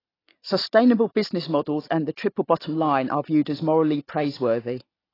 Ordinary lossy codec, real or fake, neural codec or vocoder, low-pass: AAC, 24 kbps; real; none; 5.4 kHz